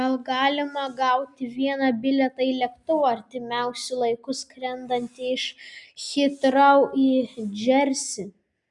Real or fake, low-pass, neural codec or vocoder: real; 10.8 kHz; none